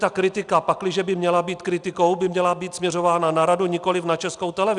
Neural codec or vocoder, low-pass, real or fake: none; 10.8 kHz; real